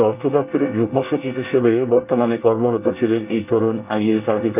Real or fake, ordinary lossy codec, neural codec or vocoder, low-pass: fake; none; codec, 24 kHz, 1 kbps, SNAC; 3.6 kHz